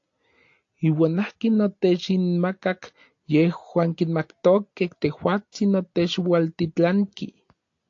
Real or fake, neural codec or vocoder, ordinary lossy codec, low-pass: real; none; AAC, 48 kbps; 7.2 kHz